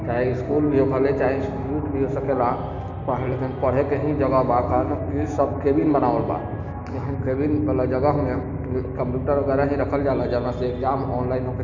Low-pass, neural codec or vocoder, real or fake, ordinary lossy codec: 7.2 kHz; none; real; none